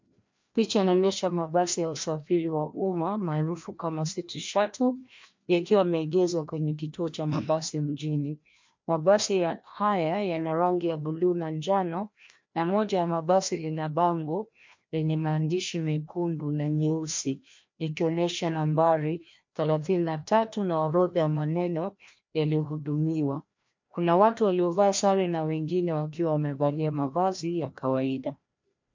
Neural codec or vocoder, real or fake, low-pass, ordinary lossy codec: codec, 16 kHz, 1 kbps, FreqCodec, larger model; fake; 7.2 kHz; MP3, 48 kbps